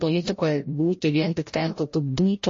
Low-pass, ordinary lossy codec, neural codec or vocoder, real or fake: 7.2 kHz; MP3, 32 kbps; codec, 16 kHz, 0.5 kbps, FreqCodec, larger model; fake